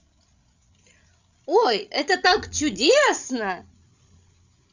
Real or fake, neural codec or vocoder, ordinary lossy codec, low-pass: fake; codec, 16 kHz, 16 kbps, FreqCodec, larger model; none; 7.2 kHz